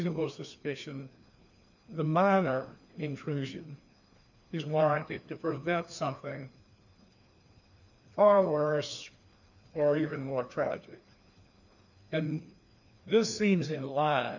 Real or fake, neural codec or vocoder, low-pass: fake; codec, 16 kHz, 2 kbps, FreqCodec, larger model; 7.2 kHz